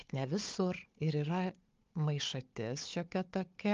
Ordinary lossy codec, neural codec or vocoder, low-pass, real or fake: Opus, 32 kbps; codec, 16 kHz, 4 kbps, FunCodec, trained on Chinese and English, 50 frames a second; 7.2 kHz; fake